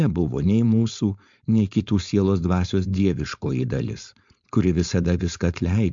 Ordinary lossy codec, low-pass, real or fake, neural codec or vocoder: MP3, 64 kbps; 7.2 kHz; fake; codec, 16 kHz, 4.8 kbps, FACodec